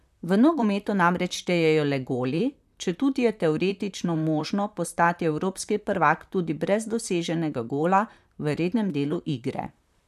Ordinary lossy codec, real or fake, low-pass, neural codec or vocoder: none; fake; 14.4 kHz; vocoder, 44.1 kHz, 128 mel bands, Pupu-Vocoder